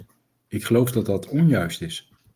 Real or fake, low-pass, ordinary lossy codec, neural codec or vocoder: real; 14.4 kHz; Opus, 32 kbps; none